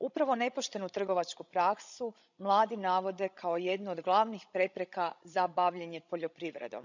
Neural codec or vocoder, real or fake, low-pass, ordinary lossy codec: codec, 16 kHz, 16 kbps, FreqCodec, larger model; fake; 7.2 kHz; none